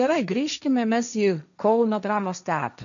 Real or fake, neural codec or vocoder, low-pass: fake; codec, 16 kHz, 1.1 kbps, Voila-Tokenizer; 7.2 kHz